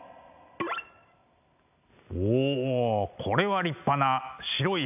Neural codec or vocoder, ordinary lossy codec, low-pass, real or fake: none; AAC, 32 kbps; 3.6 kHz; real